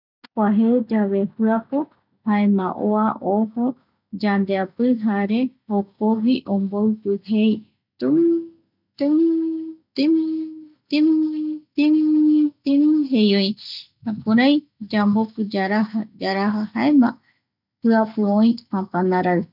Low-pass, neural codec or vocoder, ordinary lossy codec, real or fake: 5.4 kHz; none; none; real